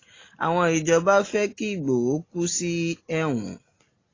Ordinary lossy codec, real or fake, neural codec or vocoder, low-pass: AAC, 32 kbps; real; none; 7.2 kHz